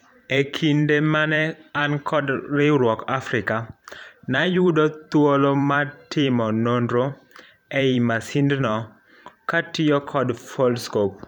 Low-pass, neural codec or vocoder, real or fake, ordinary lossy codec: 19.8 kHz; vocoder, 44.1 kHz, 128 mel bands every 512 samples, BigVGAN v2; fake; none